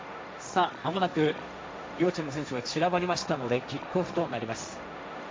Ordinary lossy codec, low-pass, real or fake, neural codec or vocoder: none; none; fake; codec, 16 kHz, 1.1 kbps, Voila-Tokenizer